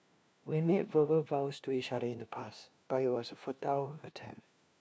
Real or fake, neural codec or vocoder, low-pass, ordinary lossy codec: fake; codec, 16 kHz, 1 kbps, FunCodec, trained on LibriTTS, 50 frames a second; none; none